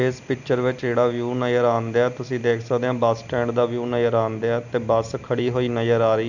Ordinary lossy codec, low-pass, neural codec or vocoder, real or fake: none; 7.2 kHz; none; real